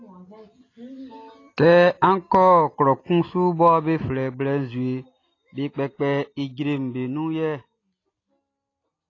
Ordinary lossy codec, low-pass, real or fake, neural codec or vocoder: AAC, 32 kbps; 7.2 kHz; real; none